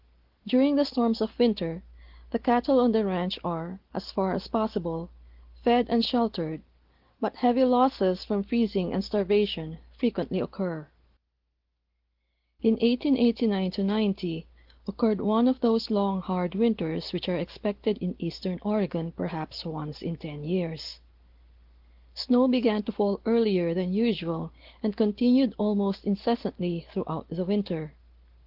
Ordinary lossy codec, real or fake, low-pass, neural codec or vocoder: Opus, 16 kbps; real; 5.4 kHz; none